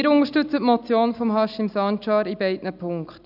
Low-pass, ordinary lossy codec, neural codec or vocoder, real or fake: 5.4 kHz; none; none; real